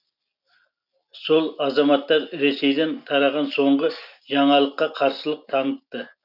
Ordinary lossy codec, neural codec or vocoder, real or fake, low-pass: none; none; real; 5.4 kHz